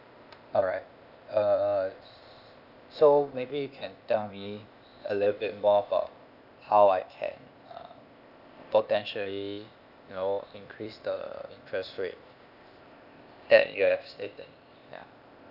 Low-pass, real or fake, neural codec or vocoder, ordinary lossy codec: 5.4 kHz; fake; codec, 16 kHz, 0.8 kbps, ZipCodec; none